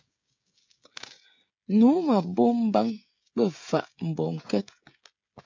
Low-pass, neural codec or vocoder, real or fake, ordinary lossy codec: 7.2 kHz; codec, 16 kHz, 16 kbps, FreqCodec, smaller model; fake; MP3, 64 kbps